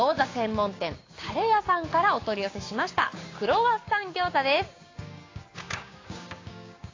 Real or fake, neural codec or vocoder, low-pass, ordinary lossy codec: fake; codec, 16 kHz, 6 kbps, DAC; 7.2 kHz; AAC, 32 kbps